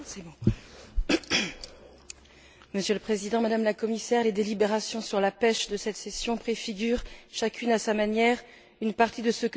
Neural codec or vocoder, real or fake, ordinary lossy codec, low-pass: none; real; none; none